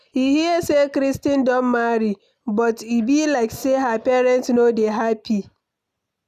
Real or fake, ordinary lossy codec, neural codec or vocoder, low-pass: real; none; none; 14.4 kHz